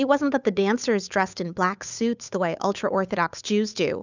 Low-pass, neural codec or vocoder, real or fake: 7.2 kHz; codec, 16 kHz, 8 kbps, FunCodec, trained on Chinese and English, 25 frames a second; fake